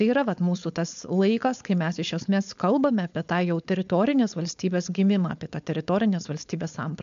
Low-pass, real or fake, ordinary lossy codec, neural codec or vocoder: 7.2 kHz; fake; MP3, 64 kbps; codec, 16 kHz, 4.8 kbps, FACodec